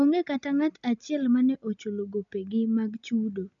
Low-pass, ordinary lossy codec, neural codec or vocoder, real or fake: 7.2 kHz; none; none; real